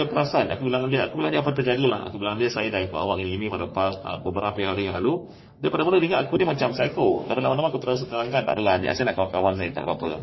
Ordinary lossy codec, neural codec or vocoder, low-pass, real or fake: MP3, 24 kbps; codec, 44.1 kHz, 3.4 kbps, Pupu-Codec; 7.2 kHz; fake